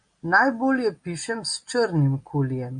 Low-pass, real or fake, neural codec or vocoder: 9.9 kHz; real; none